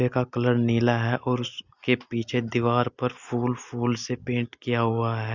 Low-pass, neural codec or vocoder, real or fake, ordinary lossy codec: 7.2 kHz; none; real; Opus, 64 kbps